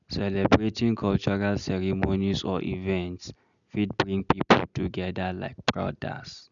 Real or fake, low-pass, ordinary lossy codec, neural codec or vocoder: real; 7.2 kHz; none; none